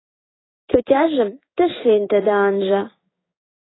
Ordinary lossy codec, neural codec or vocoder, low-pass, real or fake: AAC, 16 kbps; codec, 16 kHz in and 24 kHz out, 1 kbps, XY-Tokenizer; 7.2 kHz; fake